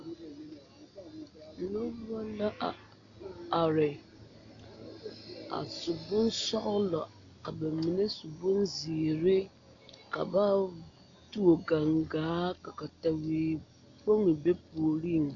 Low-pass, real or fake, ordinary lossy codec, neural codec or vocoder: 7.2 kHz; real; AAC, 48 kbps; none